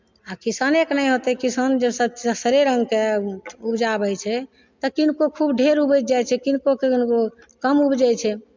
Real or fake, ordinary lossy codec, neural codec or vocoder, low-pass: real; MP3, 64 kbps; none; 7.2 kHz